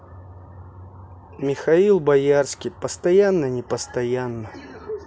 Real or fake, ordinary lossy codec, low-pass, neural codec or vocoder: real; none; none; none